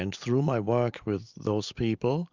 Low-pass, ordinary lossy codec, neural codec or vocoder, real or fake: 7.2 kHz; Opus, 64 kbps; none; real